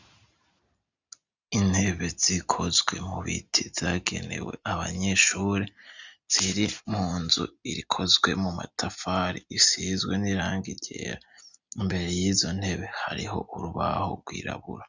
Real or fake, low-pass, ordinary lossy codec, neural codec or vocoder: real; 7.2 kHz; Opus, 64 kbps; none